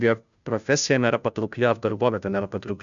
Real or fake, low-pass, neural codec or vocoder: fake; 7.2 kHz; codec, 16 kHz, 0.5 kbps, FunCodec, trained on Chinese and English, 25 frames a second